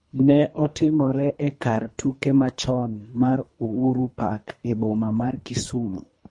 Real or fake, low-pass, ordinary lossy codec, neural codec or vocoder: fake; 10.8 kHz; MP3, 48 kbps; codec, 24 kHz, 3 kbps, HILCodec